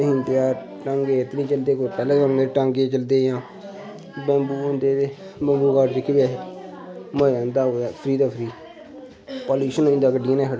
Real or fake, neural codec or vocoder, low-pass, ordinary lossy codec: real; none; none; none